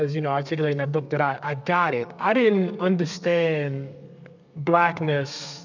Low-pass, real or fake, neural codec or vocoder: 7.2 kHz; fake; codec, 32 kHz, 1.9 kbps, SNAC